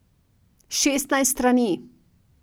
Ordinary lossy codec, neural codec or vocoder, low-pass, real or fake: none; none; none; real